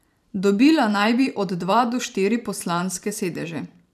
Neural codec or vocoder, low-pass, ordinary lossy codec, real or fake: vocoder, 44.1 kHz, 128 mel bands every 512 samples, BigVGAN v2; 14.4 kHz; none; fake